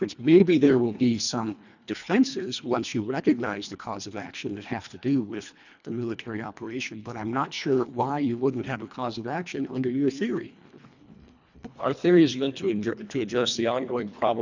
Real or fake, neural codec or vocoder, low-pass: fake; codec, 24 kHz, 1.5 kbps, HILCodec; 7.2 kHz